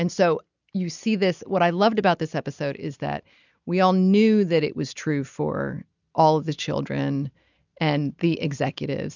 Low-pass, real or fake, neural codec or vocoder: 7.2 kHz; real; none